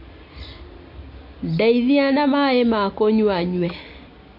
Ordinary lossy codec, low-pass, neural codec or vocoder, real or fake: MP3, 32 kbps; 5.4 kHz; none; real